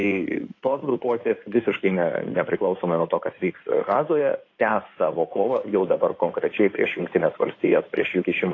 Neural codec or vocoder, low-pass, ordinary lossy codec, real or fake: codec, 16 kHz in and 24 kHz out, 2.2 kbps, FireRedTTS-2 codec; 7.2 kHz; AAC, 32 kbps; fake